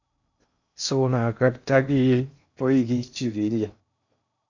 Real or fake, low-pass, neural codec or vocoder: fake; 7.2 kHz; codec, 16 kHz in and 24 kHz out, 0.6 kbps, FocalCodec, streaming, 2048 codes